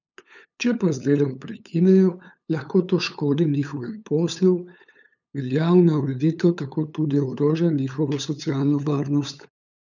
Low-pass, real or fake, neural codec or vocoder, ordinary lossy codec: 7.2 kHz; fake; codec, 16 kHz, 8 kbps, FunCodec, trained on LibriTTS, 25 frames a second; none